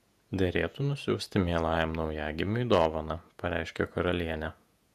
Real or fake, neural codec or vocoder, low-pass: fake; vocoder, 48 kHz, 128 mel bands, Vocos; 14.4 kHz